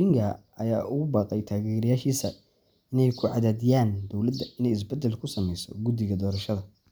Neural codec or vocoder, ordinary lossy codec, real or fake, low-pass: none; none; real; none